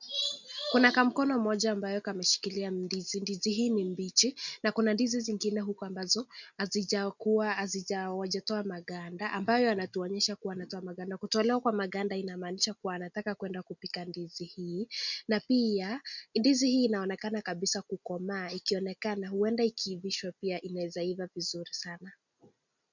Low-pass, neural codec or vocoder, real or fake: 7.2 kHz; none; real